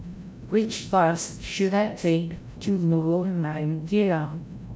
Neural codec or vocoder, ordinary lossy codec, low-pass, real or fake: codec, 16 kHz, 0.5 kbps, FreqCodec, larger model; none; none; fake